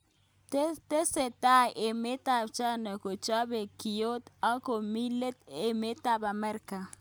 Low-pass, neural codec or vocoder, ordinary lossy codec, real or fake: none; none; none; real